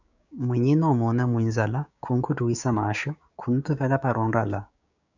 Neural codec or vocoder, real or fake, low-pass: codec, 16 kHz, 6 kbps, DAC; fake; 7.2 kHz